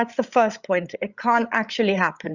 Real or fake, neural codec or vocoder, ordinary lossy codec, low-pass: fake; codec, 16 kHz, 8 kbps, FreqCodec, larger model; Opus, 64 kbps; 7.2 kHz